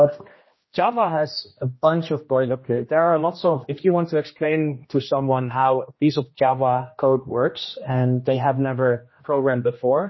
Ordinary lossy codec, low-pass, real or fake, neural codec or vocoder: MP3, 24 kbps; 7.2 kHz; fake; codec, 16 kHz, 1 kbps, X-Codec, HuBERT features, trained on general audio